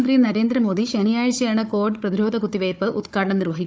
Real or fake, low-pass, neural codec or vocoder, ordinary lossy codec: fake; none; codec, 16 kHz, 4 kbps, FunCodec, trained on Chinese and English, 50 frames a second; none